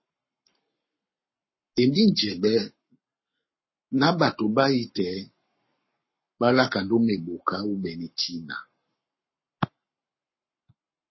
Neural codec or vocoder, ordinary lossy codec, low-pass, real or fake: codec, 44.1 kHz, 7.8 kbps, Pupu-Codec; MP3, 24 kbps; 7.2 kHz; fake